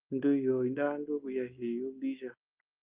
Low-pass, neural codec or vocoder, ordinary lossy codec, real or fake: 3.6 kHz; none; Opus, 32 kbps; real